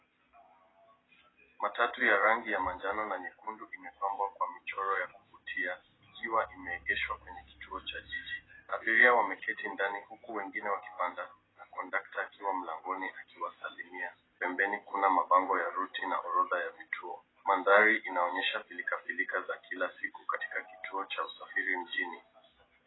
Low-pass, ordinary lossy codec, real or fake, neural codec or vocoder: 7.2 kHz; AAC, 16 kbps; real; none